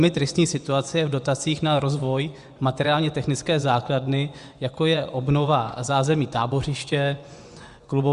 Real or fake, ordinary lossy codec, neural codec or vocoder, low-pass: fake; Opus, 64 kbps; vocoder, 24 kHz, 100 mel bands, Vocos; 10.8 kHz